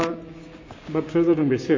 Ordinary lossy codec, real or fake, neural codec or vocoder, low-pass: MP3, 32 kbps; fake; codec, 16 kHz, 6 kbps, DAC; 7.2 kHz